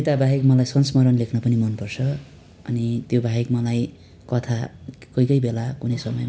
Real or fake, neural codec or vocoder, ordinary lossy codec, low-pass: real; none; none; none